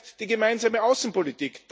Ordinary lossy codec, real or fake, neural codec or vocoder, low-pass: none; real; none; none